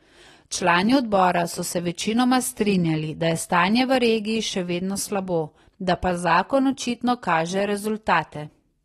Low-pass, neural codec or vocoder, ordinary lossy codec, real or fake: 19.8 kHz; none; AAC, 32 kbps; real